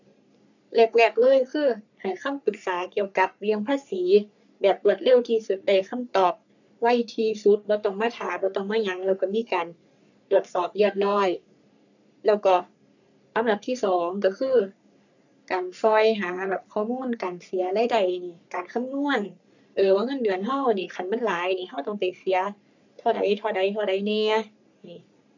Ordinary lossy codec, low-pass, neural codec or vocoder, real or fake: none; 7.2 kHz; codec, 44.1 kHz, 3.4 kbps, Pupu-Codec; fake